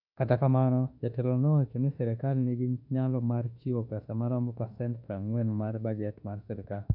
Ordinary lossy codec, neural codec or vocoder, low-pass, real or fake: none; autoencoder, 48 kHz, 32 numbers a frame, DAC-VAE, trained on Japanese speech; 5.4 kHz; fake